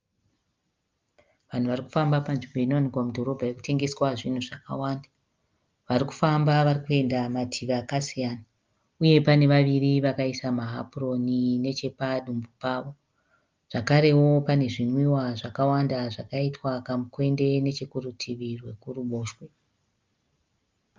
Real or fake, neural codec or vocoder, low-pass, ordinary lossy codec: real; none; 7.2 kHz; Opus, 32 kbps